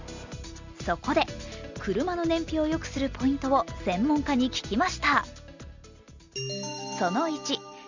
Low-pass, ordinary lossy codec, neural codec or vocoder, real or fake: 7.2 kHz; Opus, 64 kbps; none; real